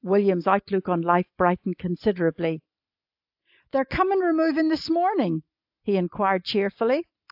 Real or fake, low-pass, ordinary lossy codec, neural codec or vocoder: real; 5.4 kHz; AAC, 48 kbps; none